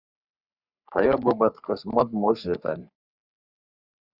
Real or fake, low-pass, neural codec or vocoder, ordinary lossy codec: fake; 5.4 kHz; codec, 44.1 kHz, 3.4 kbps, Pupu-Codec; Opus, 64 kbps